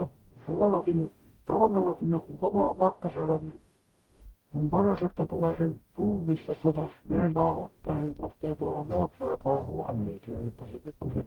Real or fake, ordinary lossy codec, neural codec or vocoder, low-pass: fake; Opus, 24 kbps; codec, 44.1 kHz, 0.9 kbps, DAC; 19.8 kHz